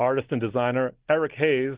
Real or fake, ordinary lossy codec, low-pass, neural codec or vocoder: real; Opus, 16 kbps; 3.6 kHz; none